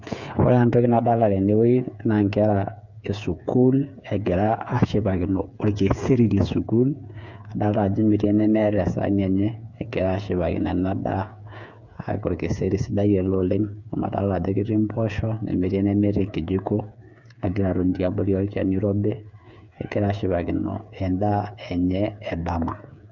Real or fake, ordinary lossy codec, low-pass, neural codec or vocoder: fake; none; 7.2 kHz; codec, 16 kHz, 8 kbps, FreqCodec, smaller model